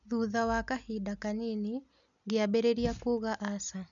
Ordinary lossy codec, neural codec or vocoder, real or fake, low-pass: none; none; real; 7.2 kHz